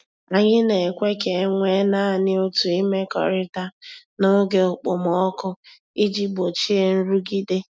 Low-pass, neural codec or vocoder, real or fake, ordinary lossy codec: none; none; real; none